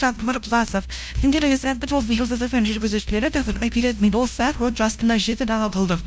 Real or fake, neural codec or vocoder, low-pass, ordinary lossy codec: fake; codec, 16 kHz, 0.5 kbps, FunCodec, trained on LibriTTS, 25 frames a second; none; none